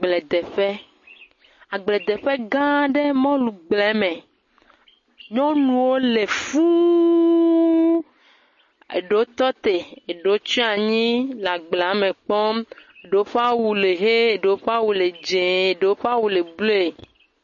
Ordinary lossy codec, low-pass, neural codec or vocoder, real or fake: MP3, 32 kbps; 7.2 kHz; none; real